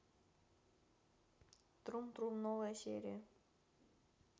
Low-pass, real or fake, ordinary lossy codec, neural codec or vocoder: none; real; none; none